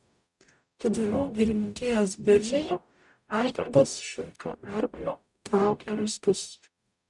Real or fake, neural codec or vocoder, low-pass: fake; codec, 44.1 kHz, 0.9 kbps, DAC; 10.8 kHz